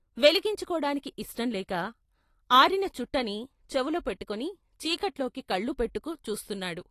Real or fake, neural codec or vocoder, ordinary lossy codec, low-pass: real; none; AAC, 48 kbps; 14.4 kHz